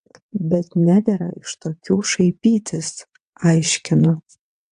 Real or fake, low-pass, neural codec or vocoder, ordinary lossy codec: fake; 9.9 kHz; vocoder, 22.05 kHz, 80 mel bands, WaveNeXt; AAC, 64 kbps